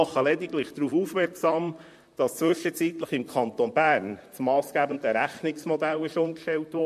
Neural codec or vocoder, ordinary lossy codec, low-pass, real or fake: vocoder, 44.1 kHz, 128 mel bands, Pupu-Vocoder; MP3, 64 kbps; 14.4 kHz; fake